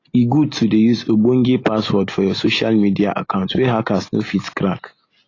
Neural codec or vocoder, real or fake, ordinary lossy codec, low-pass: none; real; AAC, 32 kbps; 7.2 kHz